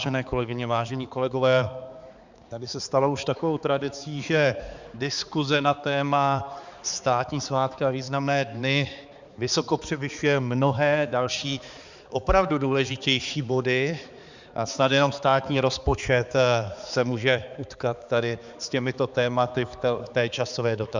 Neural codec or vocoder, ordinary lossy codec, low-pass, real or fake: codec, 16 kHz, 4 kbps, X-Codec, HuBERT features, trained on balanced general audio; Opus, 64 kbps; 7.2 kHz; fake